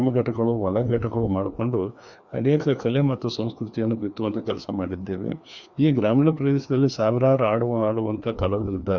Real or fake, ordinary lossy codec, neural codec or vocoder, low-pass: fake; Opus, 64 kbps; codec, 16 kHz, 2 kbps, FreqCodec, larger model; 7.2 kHz